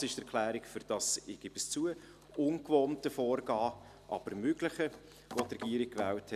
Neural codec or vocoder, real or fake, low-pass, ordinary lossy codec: none; real; 14.4 kHz; none